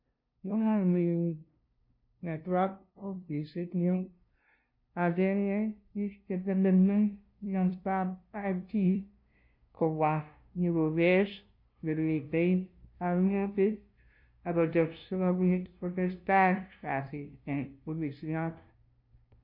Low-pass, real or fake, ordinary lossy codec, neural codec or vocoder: 5.4 kHz; fake; MP3, 32 kbps; codec, 16 kHz, 0.5 kbps, FunCodec, trained on LibriTTS, 25 frames a second